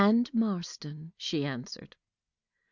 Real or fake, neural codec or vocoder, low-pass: real; none; 7.2 kHz